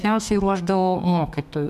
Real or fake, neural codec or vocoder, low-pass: fake; codec, 32 kHz, 1.9 kbps, SNAC; 14.4 kHz